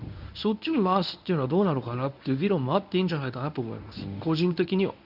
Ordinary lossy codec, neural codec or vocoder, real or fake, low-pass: none; codec, 24 kHz, 0.9 kbps, WavTokenizer, medium speech release version 1; fake; 5.4 kHz